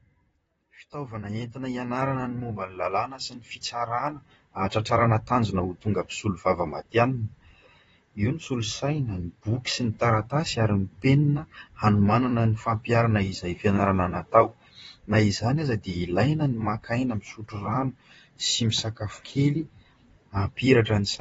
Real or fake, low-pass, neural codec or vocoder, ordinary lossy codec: fake; 19.8 kHz; vocoder, 44.1 kHz, 128 mel bands, Pupu-Vocoder; AAC, 24 kbps